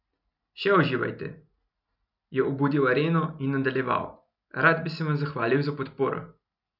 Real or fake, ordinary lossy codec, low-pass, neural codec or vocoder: real; none; 5.4 kHz; none